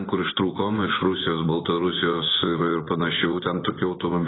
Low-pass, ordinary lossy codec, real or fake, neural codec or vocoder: 7.2 kHz; AAC, 16 kbps; fake; vocoder, 24 kHz, 100 mel bands, Vocos